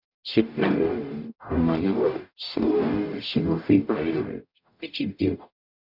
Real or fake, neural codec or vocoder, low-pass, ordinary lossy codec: fake; codec, 44.1 kHz, 0.9 kbps, DAC; 5.4 kHz; none